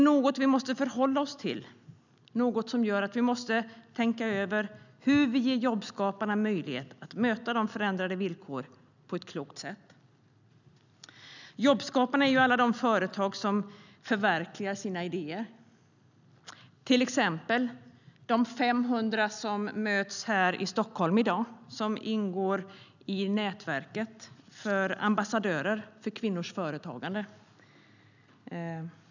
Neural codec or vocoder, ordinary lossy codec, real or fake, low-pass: none; none; real; 7.2 kHz